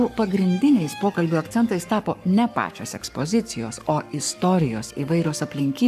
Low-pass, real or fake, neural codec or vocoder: 14.4 kHz; fake; codec, 44.1 kHz, 7.8 kbps, Pupu-Codec